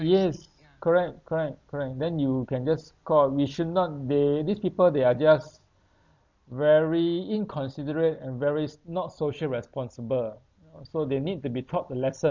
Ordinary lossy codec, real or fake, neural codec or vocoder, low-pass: MP3, 64 kbps; real; none; 7.2 kHz